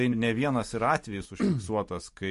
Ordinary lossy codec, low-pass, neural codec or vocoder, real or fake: MP3, 48 kbps; 14.4 kHz; none; real